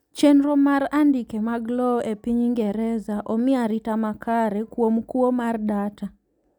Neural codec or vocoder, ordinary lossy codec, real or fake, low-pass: none; none; real; 19.8 kHz